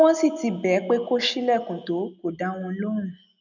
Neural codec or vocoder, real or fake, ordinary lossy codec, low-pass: none; real; none; 7.2 kHz